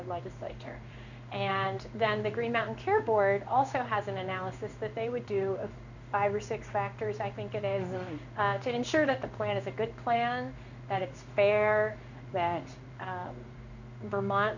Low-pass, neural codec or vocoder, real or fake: 7.2 kHz; codec, 16 kHz in and 24 kHz out, 1 kbps, XY-Tokenizer; fake